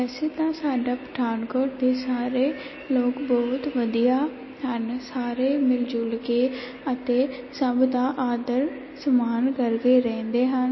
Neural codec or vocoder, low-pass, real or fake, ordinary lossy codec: none; 7.2 kHz; real; MP3, 24 kbps